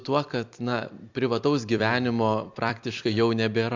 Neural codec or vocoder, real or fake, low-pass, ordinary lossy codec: vocoder, 44.1 kHz, 128 mel bands every 256 samples, BigVGAN v2; fake; 7.2 kHz; MP3, 64 kbps